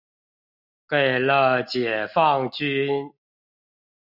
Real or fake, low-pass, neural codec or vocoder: real; 5.4 kHz; none